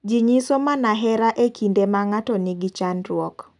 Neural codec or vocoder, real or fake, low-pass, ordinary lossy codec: none; real; 10.8 kHz; none